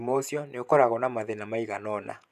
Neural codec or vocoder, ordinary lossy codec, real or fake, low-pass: vocoder, 48 kHz, 128 mel bands, Vocos; none; fake; 14.4 kHz